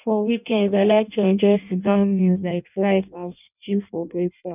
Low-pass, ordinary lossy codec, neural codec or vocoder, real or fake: 3.6 kHz; none; codec, 16 kHz in and 24 kHz out, 0.6 kbps, FireRedTTS-2 codec; fake